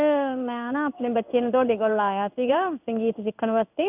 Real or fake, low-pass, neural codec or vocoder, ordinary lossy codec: fake; 3.6 kHz; codec, 16 kHz in and 24 kHz out, 1 kbps, XY-Tokenizer; none